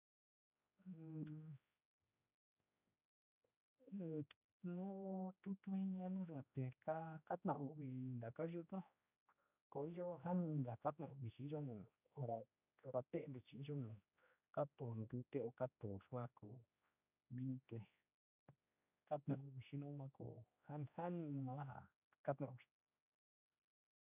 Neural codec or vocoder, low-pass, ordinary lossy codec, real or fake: codec, 16 kHz, 1 kbps, X-Codec, HuBERT features, trained on general audio; 3.6 kHz; none; fake